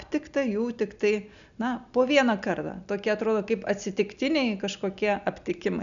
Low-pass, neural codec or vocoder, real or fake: 7.2 kHz; none; real